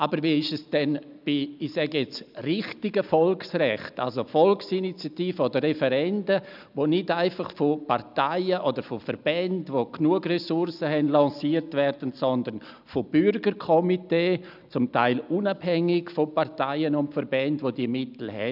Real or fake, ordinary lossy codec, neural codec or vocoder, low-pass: real; none; none; 5.4 kHz